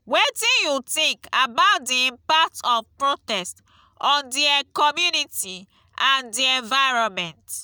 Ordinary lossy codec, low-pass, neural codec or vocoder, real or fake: none; none; none; real